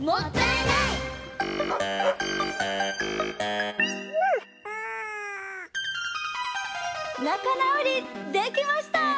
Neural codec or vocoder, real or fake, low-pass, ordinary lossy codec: none; real; none; none